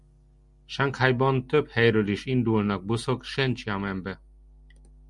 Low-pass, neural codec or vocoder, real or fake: 10.8 kHz; none; real